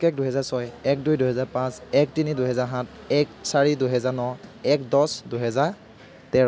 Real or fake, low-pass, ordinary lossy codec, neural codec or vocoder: real; none; none; none